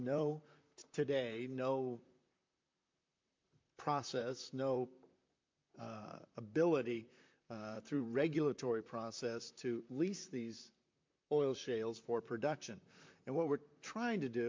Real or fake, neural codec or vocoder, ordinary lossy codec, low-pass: fake; vocoder, 44.1 kHz, 128 mel bands, Pupu-Vocoder; MP3, 48 kbps; 7.2 kHz